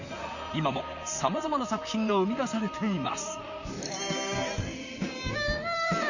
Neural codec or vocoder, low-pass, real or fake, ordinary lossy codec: vocoder, 44.1 kHz, 128 mel bands, Pupu-Vocoder; 7.2 kHz; fake; none